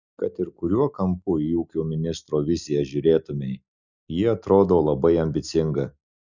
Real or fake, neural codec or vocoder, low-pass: real; none; 7.2 kHz